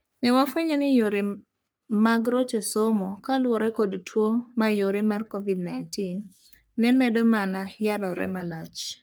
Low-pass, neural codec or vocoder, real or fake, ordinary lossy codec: none; codec, 44.1 kHz, 3.4 kbps, Pupu-Codec; fake; none